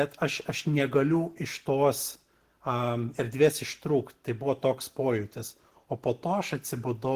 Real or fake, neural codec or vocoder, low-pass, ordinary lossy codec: real; none; 14.4 kHz; Opus, 16 kbps